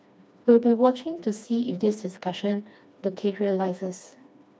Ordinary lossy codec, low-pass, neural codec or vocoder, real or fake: none; none; codec, 16 kHz, 2 kbps, FreqCodec, smaller model; fake